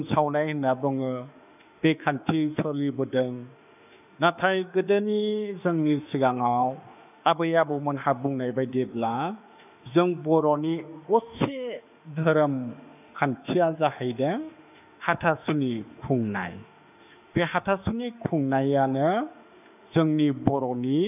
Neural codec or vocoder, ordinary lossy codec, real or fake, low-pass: autoencoder, 48 kHz, 32 numbers a frame, DAC-VAE, trained on Japanese speech; none; fake; 3.6 kHz